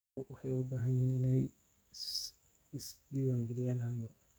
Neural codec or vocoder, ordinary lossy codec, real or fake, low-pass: codec, 44.1 kHz, 2.6 kbps, SNAC; none; fake; none